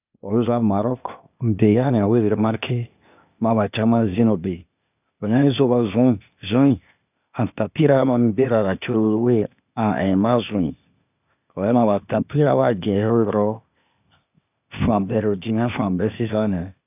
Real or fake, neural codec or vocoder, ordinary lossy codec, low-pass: fake; codec, 16 kHz, 0.8 kbps, ZipCodec; none; 3.6 kHz